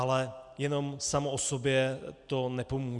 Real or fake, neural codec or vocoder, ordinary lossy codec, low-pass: real; none; Opus, 64 kbps; 10.8 kHz